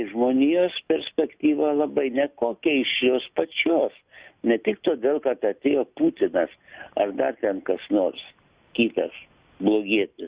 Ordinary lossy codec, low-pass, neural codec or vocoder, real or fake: Opus, 64 kbps; 3.6 kHz; none; real